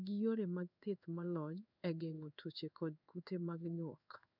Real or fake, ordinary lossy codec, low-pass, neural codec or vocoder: fake; none; 5.4 kHz; codec, 16 kHz in and 24 kHz out, 1 kbps, XY-Tokenizer